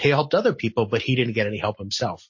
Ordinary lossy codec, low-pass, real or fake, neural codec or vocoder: MP3, 32 kbps; 7.2 kHz; real; none